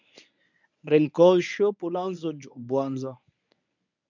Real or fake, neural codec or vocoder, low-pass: fake; codec, 24 kHz, 0.9 kbps, WavTokenizer, medium speech release version 1; 7.2 kHz